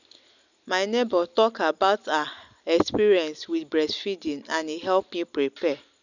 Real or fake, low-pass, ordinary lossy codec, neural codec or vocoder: real; 7.2 kHz; none; none